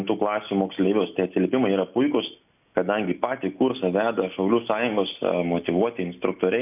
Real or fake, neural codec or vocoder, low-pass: real; none; 3.6 kHz